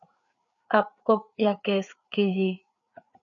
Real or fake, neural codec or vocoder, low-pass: fake; codec, 16 kHz, 4 kbps, FreqCodec, larger model; 7.2 kHz